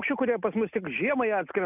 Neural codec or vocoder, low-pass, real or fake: none; 3.6 kHz; real